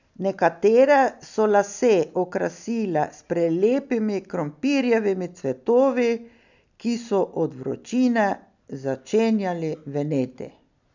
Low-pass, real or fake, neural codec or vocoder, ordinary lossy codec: 7.2 kHz; real; none; none